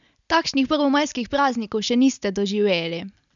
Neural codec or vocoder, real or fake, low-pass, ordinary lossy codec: none; real; 7.2 kHz; none